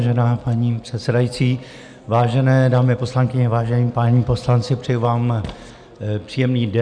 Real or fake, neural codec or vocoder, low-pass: real; none; 9.9 kHz